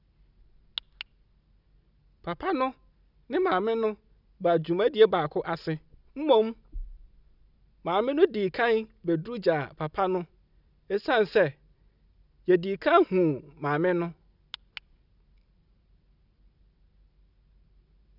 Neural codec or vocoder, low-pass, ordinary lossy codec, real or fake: none; 5.4 kHz; none; real